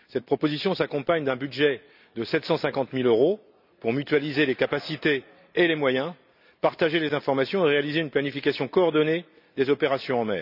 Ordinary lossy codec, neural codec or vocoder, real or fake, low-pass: none; none; real; 5.4 kHz